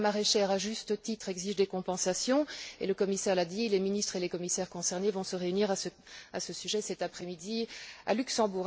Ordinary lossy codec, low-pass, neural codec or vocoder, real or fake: none; none; none; real